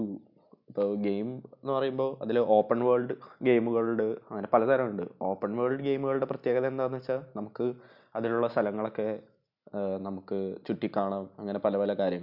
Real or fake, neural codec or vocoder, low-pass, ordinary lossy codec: real; none; 5.4 kHz; none